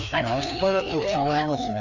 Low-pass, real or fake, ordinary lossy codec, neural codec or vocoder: 7.2 kHz; fake; none; codec, 16 kHz, 2 kbps, FreqCodec, larger model